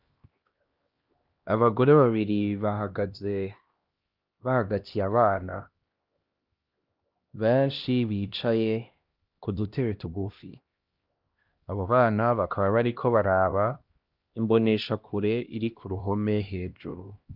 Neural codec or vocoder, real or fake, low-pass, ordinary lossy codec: codec, 16 kHz, 1 kbps, X-Codec, HuBERT features, trained on LibriSpeech; fake; 5.4 kHz; Opus, 24 kbps